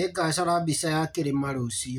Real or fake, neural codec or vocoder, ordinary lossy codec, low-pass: real; none; none; none